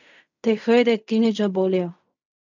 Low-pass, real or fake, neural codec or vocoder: 7.2 kHz; fake; codec, 16 kHz in and 24 kHz out, 0.4 kbps, LongCat-Audio-Codec, fine tuned four codebook decoder